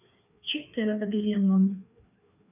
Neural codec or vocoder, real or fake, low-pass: codec, 16 kHz, 2 kbps, FreqCodec, larger model; fake; 3.6 kHz